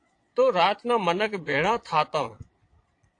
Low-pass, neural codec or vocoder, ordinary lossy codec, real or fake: 9.9 kHz; vocoder, 22.05 kHz, 80 mel bands, Vocos; AAC, 48 kbps; fake